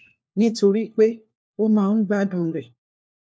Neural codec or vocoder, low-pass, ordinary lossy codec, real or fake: codec, 16 kHz, 1 kbps, FunCodec, trained on LibriTTS, 50 frames a second; none; none; fake